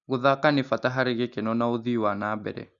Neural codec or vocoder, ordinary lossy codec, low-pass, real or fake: none; Opus, 64 kbps; 7.2 kHz; real